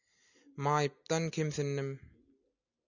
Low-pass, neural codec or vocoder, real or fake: 7.2 kHz; none; real